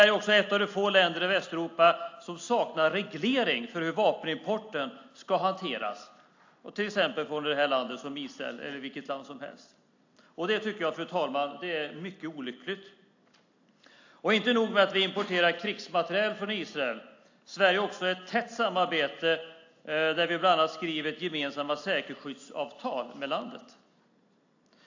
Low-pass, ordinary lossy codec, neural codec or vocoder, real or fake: 7.2 kHz; AAC, 48 kbps; none; real